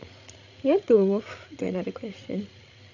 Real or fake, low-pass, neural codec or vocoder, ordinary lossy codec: fake; 7.2 kHz; codec, 16 kHz, 16 kbps, FreqCodec, larger model; none